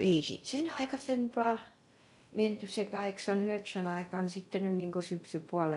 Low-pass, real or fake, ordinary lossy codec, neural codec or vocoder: 10.8 kHz; fake; none; codec, 16 kHz in and 24 kHz out, 0.6 kbps, FocalCodec, streaming, 2048 codes